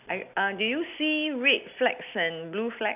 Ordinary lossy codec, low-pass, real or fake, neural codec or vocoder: none; 3.6 kHz; real; none